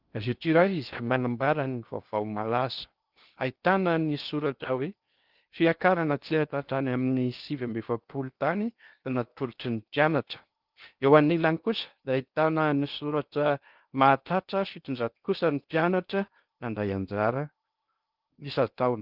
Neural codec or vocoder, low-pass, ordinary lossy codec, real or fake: codec, 16 kHz in and 24 kHz out, 0.6 kbps, FocalCodec, streaming, 2048 codes; 5.4 kHz; Opus, 32 kbps; fake